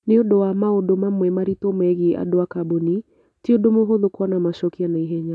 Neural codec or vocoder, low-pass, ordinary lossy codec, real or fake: none; none; none; real